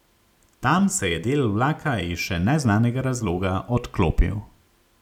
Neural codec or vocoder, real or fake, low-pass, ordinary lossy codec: none; real; 19.8 kHz; none